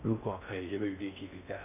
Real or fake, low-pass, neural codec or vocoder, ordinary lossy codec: fake; 3.6 kHz; codec, 16 kHz in and 24 kHz out, 0.6 kbps, FocalCodec, streaming, 4096 codes; none